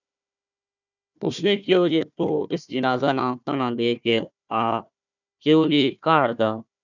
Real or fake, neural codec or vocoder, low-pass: fake; codec, 16 kHz, 1 kbps, FunCodec, trained on Chinese and English, 50 frames a second; 7.2 kHz